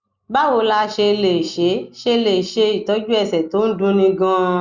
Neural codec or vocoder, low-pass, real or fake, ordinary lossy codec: none; 7.2 kHz; real; Opus, 64 kbps